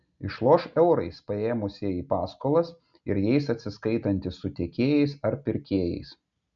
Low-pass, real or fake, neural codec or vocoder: 7.2 kHz; real; none